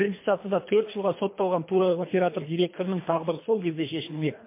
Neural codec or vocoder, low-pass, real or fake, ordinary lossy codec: codec, 24 kHz, 3 kbps, HILCodec; 3.6 kHz; fake; MP3, 24 kbps